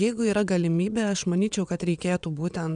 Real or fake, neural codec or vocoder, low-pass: fake; vocoder, 22.05 kHz, 80 mel bands, WaveNeXt; 9.9 kHz